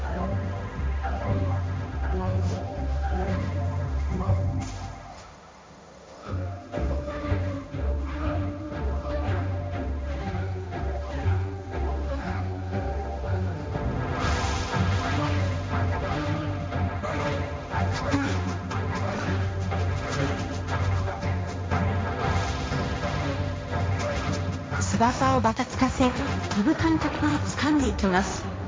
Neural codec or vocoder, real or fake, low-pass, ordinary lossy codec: codec, 16 kHz, 1.1 kbps, Voila-Tokenizer; fake; none; none